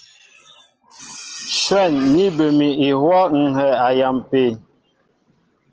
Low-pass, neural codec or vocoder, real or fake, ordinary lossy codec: 7.2 kHz; none; real; Opus, 16 kbps